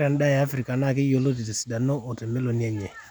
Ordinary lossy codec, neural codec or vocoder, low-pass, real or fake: none; none; 19.8 kHz; real